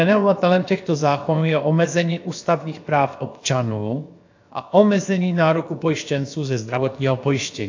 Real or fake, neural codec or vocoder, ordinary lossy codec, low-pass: fake; codec, 16 kHz, about 1 kbps, DyCAST, with the encoder's durations; AAC, 48 kbps; 7.2 kHz